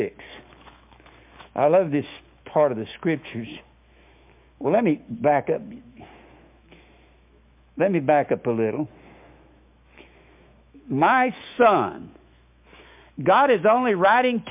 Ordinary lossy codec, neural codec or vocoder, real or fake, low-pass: MP3, 32 kbps; autoencoder, 48 kHz, 128 numbers a frame, DAC-VAE, trained on Japanese speech; fake; 3.6 kHz